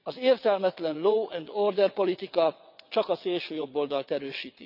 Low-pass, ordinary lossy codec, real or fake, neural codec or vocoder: 5.4 kHz; none; fake; vocoder, 22.05 kHz, 80 mel bands, WaveNeXt